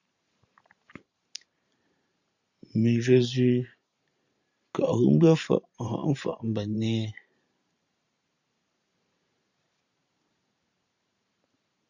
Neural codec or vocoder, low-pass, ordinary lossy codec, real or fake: vocoder, 44.1 kHz, 128 mel bands every 512 samples, BigVGAN v2; 7.2 kHz; Opus, 64 kbps; fake